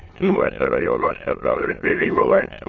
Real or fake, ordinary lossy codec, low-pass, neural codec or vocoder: fake; AAC, 32 kbps; 7.2 kHz; autoencoder, 22.05 kHz, a latent of 192 numbers a frame, VITS, trained on many speakers